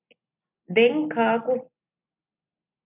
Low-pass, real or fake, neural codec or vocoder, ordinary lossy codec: 3.6 kHz; real; none; MP3, 32 kbps